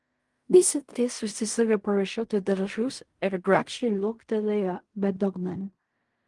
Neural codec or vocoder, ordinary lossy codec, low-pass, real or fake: codec, 16 kHz in and 24 kHz out, 0.4 kbps, LongCat-Audio-Codec, fine tuned four codebook decoder; Opus, 24 kbps; 10.8 kHz; fake